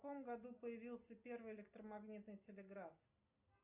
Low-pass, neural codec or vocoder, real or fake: 3.6 kHz; none; real